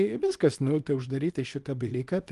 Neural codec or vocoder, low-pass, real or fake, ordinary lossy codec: codec, 24 kHz, 0.9 kbps, WavTokenizer, small release; 10.8 kHz; fake; Opus, 24 kbps